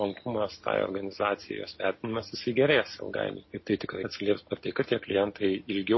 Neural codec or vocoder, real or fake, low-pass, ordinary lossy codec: none; real; 7.2 kHz; MP3, 24 kbps